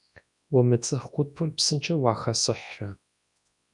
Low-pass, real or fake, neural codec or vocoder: 10.8 kHz; fake; codec, 24 kHz, 0.9 kbps, WavTokenizer, large speech release